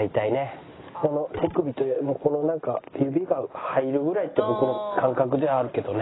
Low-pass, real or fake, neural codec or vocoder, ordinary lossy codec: 7.2 kHz; real; none; AAC, 16 kbps